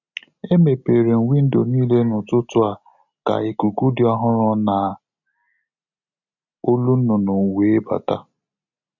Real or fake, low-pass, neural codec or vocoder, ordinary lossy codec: real; 7.2 kHz; none; none